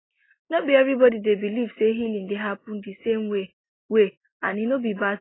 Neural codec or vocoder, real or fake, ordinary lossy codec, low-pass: none; real; AAC, 16 kbps; 7.2 kHz